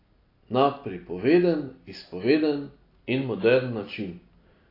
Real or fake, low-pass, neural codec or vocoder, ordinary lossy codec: real; 5.4 kHz; none; AAC, 32 kbps